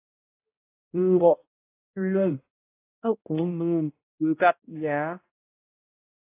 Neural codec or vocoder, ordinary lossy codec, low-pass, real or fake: codec, 16 kHz, 0.5 kbps, X-Codec, HuBERT features, trained on balanced general audio; AAC, 24 kbps; 3.6 kHz; fake